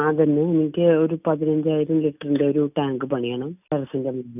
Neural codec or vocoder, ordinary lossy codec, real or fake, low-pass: none; none; real; 3.6 kHz